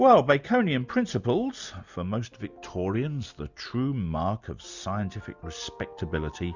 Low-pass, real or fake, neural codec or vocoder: 7.2 kHz; real; none